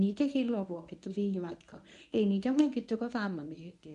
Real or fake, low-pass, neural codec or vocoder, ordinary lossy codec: fake; 10.8 kHz; codec, 24 kHz, 0.9 kbps, WavTokenizer, medium speech release version 1; none